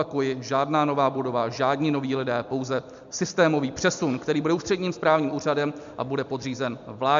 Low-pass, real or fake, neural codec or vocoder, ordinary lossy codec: 7.2 kHz; real; none; MP3, 64 kbps